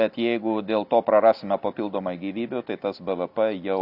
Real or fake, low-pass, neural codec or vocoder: real; 5.4 kHz; none